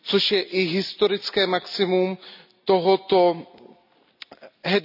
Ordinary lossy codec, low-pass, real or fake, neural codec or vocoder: none; 5.4 kHz; real; none